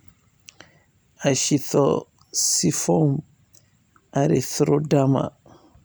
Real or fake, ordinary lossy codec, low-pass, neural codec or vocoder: fake; none; none; vocoder, 44.1 kHz, 128 mel bands every 256 samples, BigVGAN v2